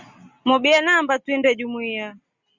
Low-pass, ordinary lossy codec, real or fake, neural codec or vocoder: 7.2 kHz; Opus, 64 kbps; real; none